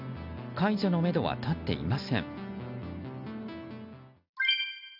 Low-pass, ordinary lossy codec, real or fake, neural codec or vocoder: 5.4 kHz; none; real; none